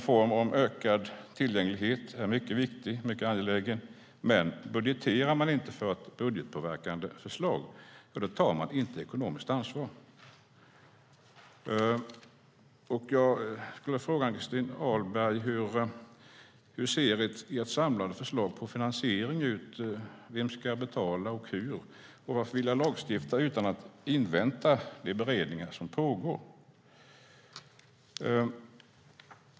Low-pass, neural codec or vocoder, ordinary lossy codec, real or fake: none; none; none; real